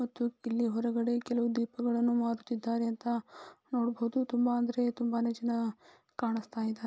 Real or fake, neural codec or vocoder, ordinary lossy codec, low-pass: real; none; none; none